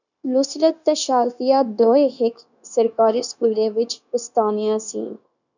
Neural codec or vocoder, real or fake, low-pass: codec, 16 kHz, 0.9 kbps, LongCat-Audio-Codec; fake; 7.2 kHz